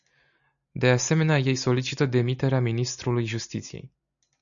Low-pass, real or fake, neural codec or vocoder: 7.2 kHz; real; none